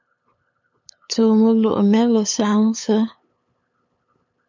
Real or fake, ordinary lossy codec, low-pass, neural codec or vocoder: fake; MP3, 64 kbps; 7.2 kHz; codec, 16 kHz, 8 kbps, FunCodec, trained on LibriTTS, 25 frames a second